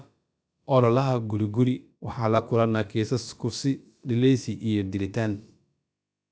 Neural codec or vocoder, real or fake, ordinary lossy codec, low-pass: codec, 16 kHz, about 1 kbps, DyCAST, with the encoder's durations; fake; none; none